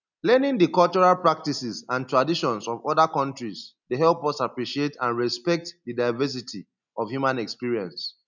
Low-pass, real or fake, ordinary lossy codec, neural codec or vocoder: 7.2 kHz; real; none; none